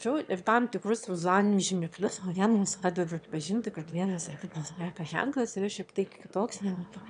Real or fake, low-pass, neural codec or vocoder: fake; 9.9 kHz; autoencoder, 22.05 kHz, a latent of 192 numbers a frame, VITS, trained on one speaker